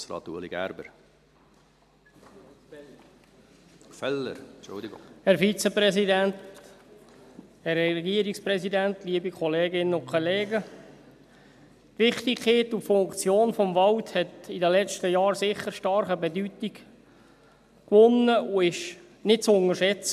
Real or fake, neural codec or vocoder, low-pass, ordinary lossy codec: real; none; 14.4 kHz; MP3, 96 kbps